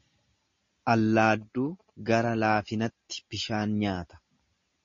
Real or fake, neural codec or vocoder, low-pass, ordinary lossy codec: real; none; 7.2 kHz; MP3, 32 kbps